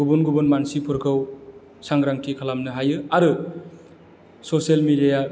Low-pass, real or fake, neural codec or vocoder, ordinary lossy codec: none; real; none; none